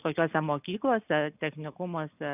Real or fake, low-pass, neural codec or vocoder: real; 3.6 kHz; none